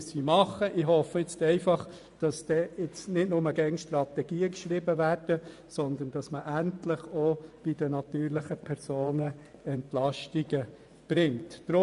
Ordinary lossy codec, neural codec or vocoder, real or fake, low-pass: none; vocoder, 24 kHz, 100 mel bands, Vocos; fake; 10.8 kHz